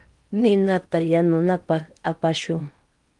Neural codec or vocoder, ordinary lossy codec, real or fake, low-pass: codec, 16 kHz in and 24 kHz out, 0.8 kbps, FocalCodec, streaming, 65536 codes; Opus, 32 kbps; fake; 10.8 kHz